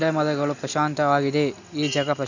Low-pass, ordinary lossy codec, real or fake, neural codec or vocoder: 7.2 kHz; none; real; none